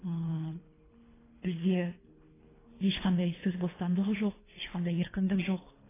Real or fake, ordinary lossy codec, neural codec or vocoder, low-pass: fake; AAC, 16 kbps; codec, 24 kHz, 3 kbps, HILCodec; 3.6 kHz